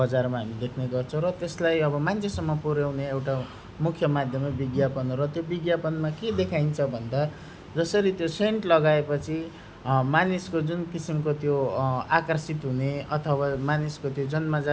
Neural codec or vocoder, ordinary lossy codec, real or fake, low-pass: none; none; real; none